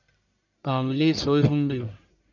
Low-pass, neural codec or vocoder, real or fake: 7.2 kHz; codec, 44.1 kHz, 1.7 kbps, Pupu-Codec; fake